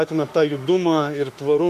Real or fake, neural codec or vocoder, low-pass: fake; autoencoder, 48 kHz, 32 numbers a frame, DAC-VAE, trained on Japanese speech; 14.4 kHz